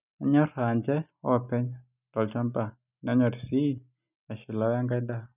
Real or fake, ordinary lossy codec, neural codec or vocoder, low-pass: real; none; none; 3.6 kHz